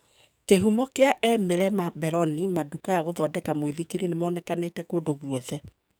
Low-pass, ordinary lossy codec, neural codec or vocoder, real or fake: none; none; codec, 44.1 kHz, 2.6 kbps, SNAC; fake